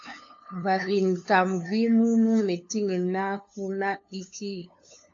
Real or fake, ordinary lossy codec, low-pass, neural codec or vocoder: fake; AAC, 48 kbps; 7.2 kHz; codec, 16 kHz, 2 kbps, FunCodec, trained on LibriTTS, 25 frames a second